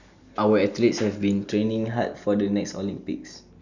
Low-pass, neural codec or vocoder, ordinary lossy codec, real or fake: 7.2 kHz; none; none; real